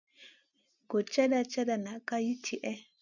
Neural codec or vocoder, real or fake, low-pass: none; real; 7.2 kHz